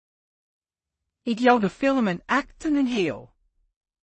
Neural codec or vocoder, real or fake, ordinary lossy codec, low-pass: codec, 16 kHz in and 24 kHz out, 0.4 kbps, LongCat-Audio-Codec, two codebook decoder; fake; MP3, 32 kbps; 10.8 kHz